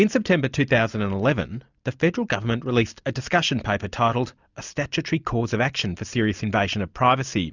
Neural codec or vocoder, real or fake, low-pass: none; real; 7.2 kHz